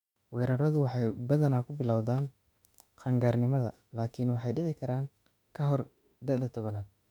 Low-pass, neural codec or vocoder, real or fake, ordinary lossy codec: 19.8 kHz; autoencoder, 48 kHz, 32 numbers a frame, DAC-VAE, trained on Japanese speech; fake; none